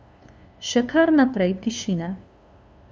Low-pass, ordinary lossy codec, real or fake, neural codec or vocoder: none; none; fake; codec, 16 kHz, 2 kbps, FunCodec, trained on LibriTTS, 25 frames a second